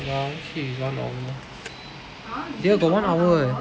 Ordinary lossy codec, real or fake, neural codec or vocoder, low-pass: none; real; none; none